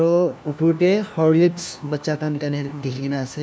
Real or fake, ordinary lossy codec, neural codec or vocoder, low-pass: fake; none; codec, 16 kHz, 1 kbps, FunCodec, trained on LibriTTS, 50 frames a second; none